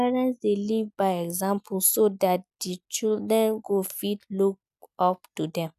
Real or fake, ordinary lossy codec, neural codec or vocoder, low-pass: real; none; none; 14.4 kHz